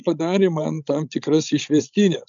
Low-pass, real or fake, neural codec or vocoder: 7.2 kHz; fake; codec, 16 kHz, 16 kbps, FreqCodec, larger model